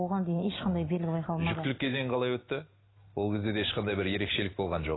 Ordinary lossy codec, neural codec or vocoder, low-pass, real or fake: AAC, 16 kbps; none; 7.2 kHz; real